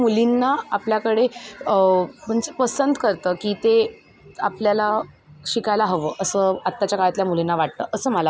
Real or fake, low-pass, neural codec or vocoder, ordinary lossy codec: real; none; none; none